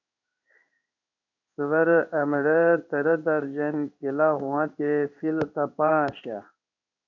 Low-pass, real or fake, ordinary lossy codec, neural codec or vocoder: 7.2 kHz; fake; AAC, 48 kbps; codec, 16 kHz in and 24 kHz out, 1 kbps, XY-Tokenizer